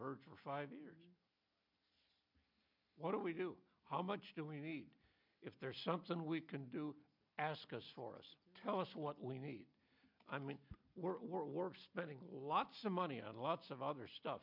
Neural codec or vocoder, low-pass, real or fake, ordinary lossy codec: none; 5.4 kHz; real; MP3, 48 kbps